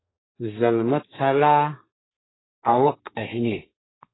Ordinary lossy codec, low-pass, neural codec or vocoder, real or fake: AAC, 16 kbps; 7.2 kHz; codec, 32 kHz, 1.9 kbps, SNAC; fake